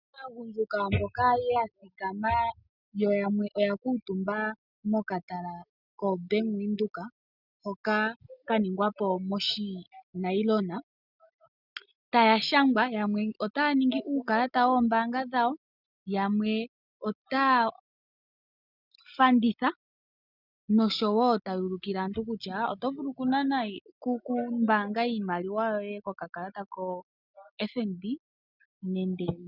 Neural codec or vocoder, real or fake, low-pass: none; real; 5.4 kHz